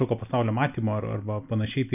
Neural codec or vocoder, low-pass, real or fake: none; 3.6 kHz; real